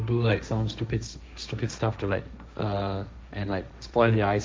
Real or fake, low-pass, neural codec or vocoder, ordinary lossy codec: fake; none; codec, 16 kHz, 1.1 kbps, Voila-Tokenizer; none